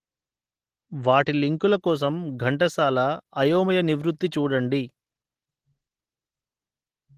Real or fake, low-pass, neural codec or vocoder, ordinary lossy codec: real; 14.4 kHz; none; Opus, 16 kbps